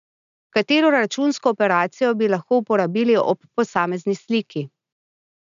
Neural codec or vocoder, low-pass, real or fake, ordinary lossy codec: none; 7.2 kHz; real; none